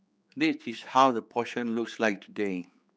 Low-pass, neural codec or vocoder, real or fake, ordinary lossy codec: none; codec, 16 kHz, 4 kbps, X-Codec, HuBERT features, trained on general audio; fake; none